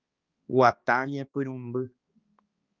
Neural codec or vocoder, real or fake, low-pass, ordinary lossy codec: codec, 16 kHz, 2 kbps, X-Codec, HuBERT features, trained on balanced general audio; fake; 7.2 kHz; Opus, 24 kbps